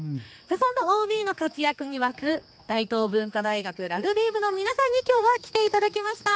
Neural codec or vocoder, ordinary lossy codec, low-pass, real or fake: codec, 16 kHz, 2 kbps, X-Codec, HuBERT features, trained on general audio; none; none; fake